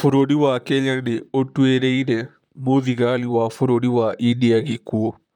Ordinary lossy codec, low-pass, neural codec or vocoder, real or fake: none; 19.8 kHz; vocoder, 44.1 kHz, 128 mel bands, Pupu-Vocoder; fake